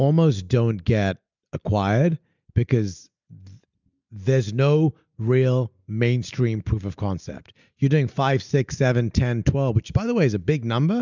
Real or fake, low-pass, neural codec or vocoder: real; 7.2 kHz; none